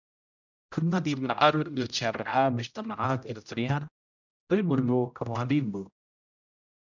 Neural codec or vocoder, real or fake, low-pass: codec, 16 kHz, 0.5 kbps, X-Codec, HuBERT features, trained on general audio; fake; 7.2 kHz